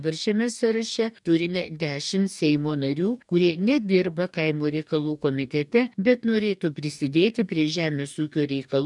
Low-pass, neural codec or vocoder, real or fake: 10.8 kHz; codec, 44.1 kHz, 2.6 kbps, DAC; fake